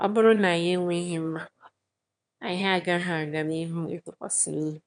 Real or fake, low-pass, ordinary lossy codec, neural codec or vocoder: fake; 9.9 kHz; none; autoencoder, 22.05 kHz, a latent of 192 numbers a frame, VITS, trained on one speaker